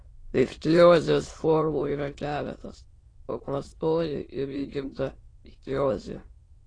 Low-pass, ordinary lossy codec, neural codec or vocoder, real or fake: 9.9 kHz; AAC, 32 kbps; autoencoder, 22.05 kHz, a latent of 192 numbers a frame, VITS, trained on many speakers; fake